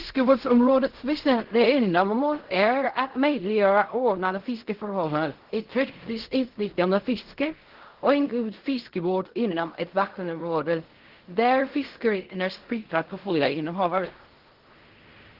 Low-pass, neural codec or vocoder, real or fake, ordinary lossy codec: 5.4 kHz; codec, 16 kHz in and 24 kHz out, 0.4 kbps, LongCat-Audio-Codec, fine tuned four codebook decoder; fake; Opus, 16 kbps